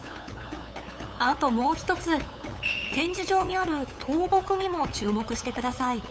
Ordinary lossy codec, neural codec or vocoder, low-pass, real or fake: none; codec, 16 kHz, 8 kbps, FunCodec, trained on LibriTTS, 25 frames a second; none; fake